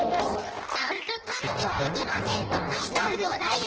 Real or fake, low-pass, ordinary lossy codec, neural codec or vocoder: fake; 7.2 kHz; Opus, 16 kbps; codec, 16 kHz in and 24 kHz out, 0.6 kbps, FireRedTTS-2 codec